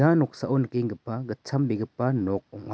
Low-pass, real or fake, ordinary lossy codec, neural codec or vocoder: none; real; none; none